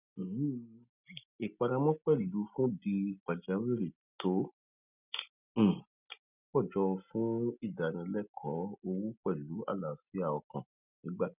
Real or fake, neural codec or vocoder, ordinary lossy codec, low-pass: real; none; none; 3.6 kHz